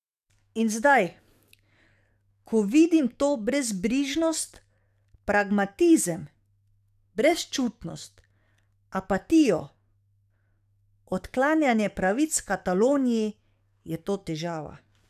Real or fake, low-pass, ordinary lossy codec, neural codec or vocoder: fake; 14.4 kHz; none; codec, 44.1 kHz, 7.8 kbps, DAC